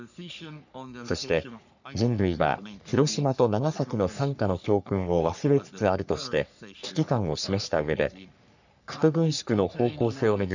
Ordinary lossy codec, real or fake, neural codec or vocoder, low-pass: none; fake; codec, 44.1 kHz, 3.4 kbps, Pupu-Codec; 7.2 kHz